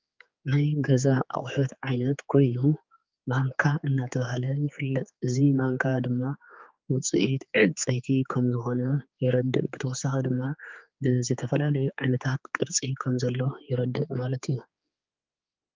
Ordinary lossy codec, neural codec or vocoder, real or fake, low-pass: Opus, 24 kbps; codec, 16 kHz, 4 kbps, X-Codec, HuBERT features, trained on general audio; fake; 7.2 kHz